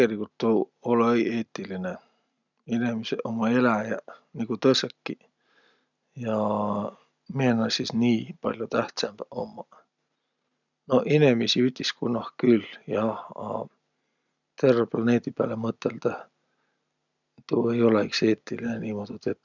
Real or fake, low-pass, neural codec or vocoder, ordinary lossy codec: real; 7.2 kHz; none; none